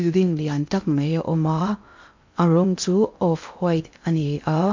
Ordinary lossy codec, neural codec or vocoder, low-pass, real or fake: MP3, 48 kbps; codec, 16 kHz in and 24 kHz out, 0.6 kbps, FocalCodec, streaming, 2048 codes; 7.2 kHz; fake